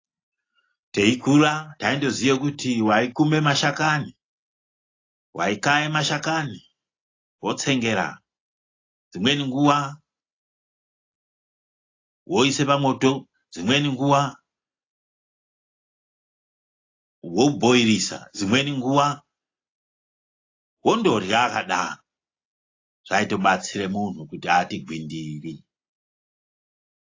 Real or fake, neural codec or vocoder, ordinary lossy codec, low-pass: real; none; AAC, 32 kbps; 7.2 kHz